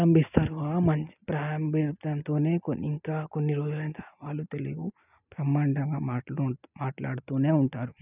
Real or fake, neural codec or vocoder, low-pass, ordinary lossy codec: real; none; 3.6 kHz; none